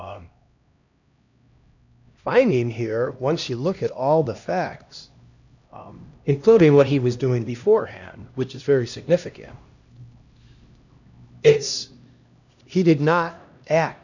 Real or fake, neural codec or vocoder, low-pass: fake; codec, 16 kHz, 1 kbps, X-Codec, HuBERT features, trained on LibriSpeech; 7.2 kHz